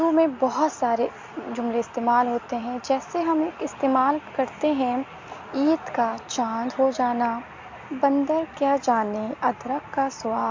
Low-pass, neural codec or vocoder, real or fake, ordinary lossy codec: 7.2 kHz; none; real; MP3, 48 kbps